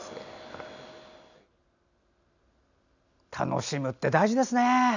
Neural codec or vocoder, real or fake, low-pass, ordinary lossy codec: none; real; 7.2 kHz; none